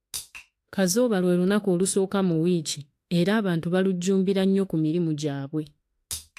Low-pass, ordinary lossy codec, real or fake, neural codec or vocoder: 14.4 kHz; AAC, 64 kbps; fake; autoencoder, 48 kHz, 32 numbers a frame, DAC-VAE, trained on Japanese speech